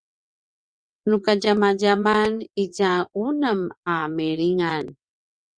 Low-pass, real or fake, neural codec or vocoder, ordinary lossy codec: 9.9 kHz; fake; vocoder, 22.05 kHz, 80 mel bands, WaveNeXt; Opus, 64 kbps